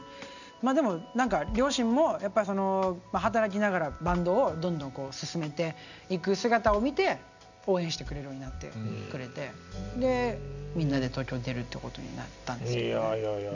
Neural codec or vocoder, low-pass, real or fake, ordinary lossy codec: none; 7.2 kHz; real; none